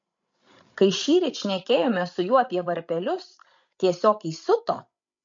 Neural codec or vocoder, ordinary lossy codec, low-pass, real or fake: codec, 16 kHz, 16 kbps, FreqCodec, larger model; MP3, 48 kbps; 7.2 kHz; fake